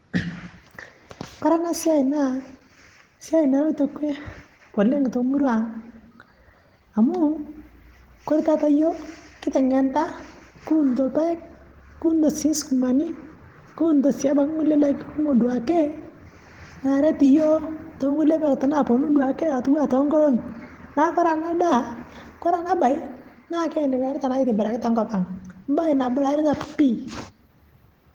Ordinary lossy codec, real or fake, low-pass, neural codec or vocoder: Opus, 16 kbps; fake; 19.8 kHz; vocoder, 44.1 kHz, 128 mel bands every 512 samples, BigVGAN v2